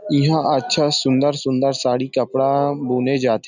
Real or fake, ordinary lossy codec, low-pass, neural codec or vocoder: real; none; 7.2 kHz; none